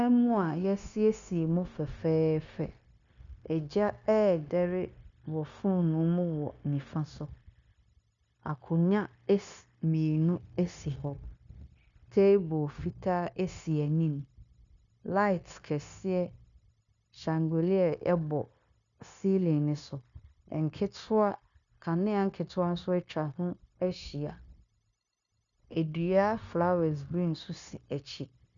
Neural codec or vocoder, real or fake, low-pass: codec, 16 kHz, 0.9 kbps, LongCat-Audio-Codec; fake; 7.2 kHz